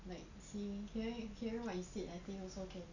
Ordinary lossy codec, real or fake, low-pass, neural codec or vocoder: none; real; 7.2 kHz; none